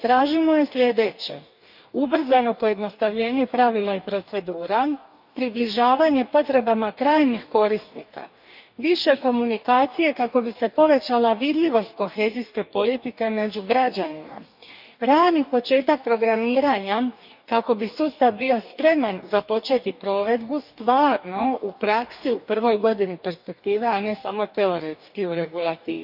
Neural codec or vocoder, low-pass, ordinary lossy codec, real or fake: codec, 44.1 kHz, 2.6 kbps, DAC; 5.4 kHz; none; fake